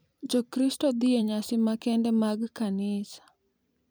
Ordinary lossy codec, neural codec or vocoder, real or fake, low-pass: none; vocoder, 44.1 kHz, 128 mel bands every 256 samples, BigVGAN v2; fake; none